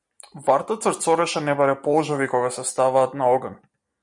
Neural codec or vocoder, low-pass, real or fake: none; 10.8 kHz; real